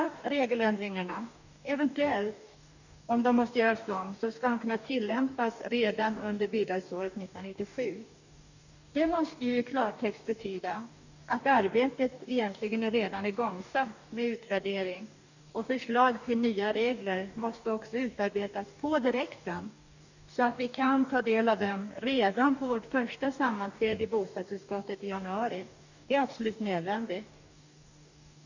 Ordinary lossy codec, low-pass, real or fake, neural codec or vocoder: none; 7.2 kHz; fake; codec, 44.1 kHz, 2.6 kbps, DAC